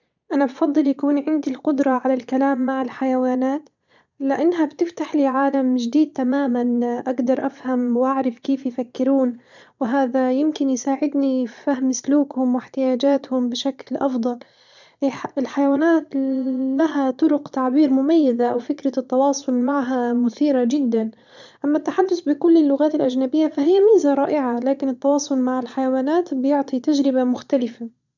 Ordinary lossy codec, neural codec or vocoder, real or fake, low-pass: none; vocoder, 22.05 kHz, 80 mel bands, Vocos; fake; 7.2 kHz